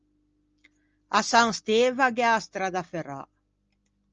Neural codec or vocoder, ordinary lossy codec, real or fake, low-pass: none; Opus, 24 kbps; real; 7.2 kHz